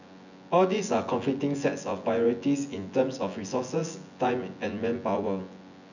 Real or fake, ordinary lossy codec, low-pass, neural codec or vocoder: fake; none; 7.2 kHz; vocoder, 24 kHz, 100 mel bands, Vocos